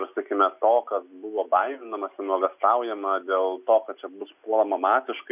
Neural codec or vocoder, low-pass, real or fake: none; 3.6 kHz; real